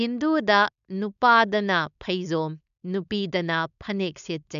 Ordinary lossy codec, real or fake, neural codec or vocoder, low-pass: none; fake; codec, 16 kHz, 4.8 kbps, FACodec; 7.2 kHz